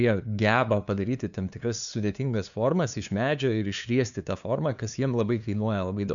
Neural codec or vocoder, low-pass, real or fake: codec, 16 kHz, 2 kbps, FunCodec, trained on LibriTTS, 25 frames a second; 7.2 kHz; fake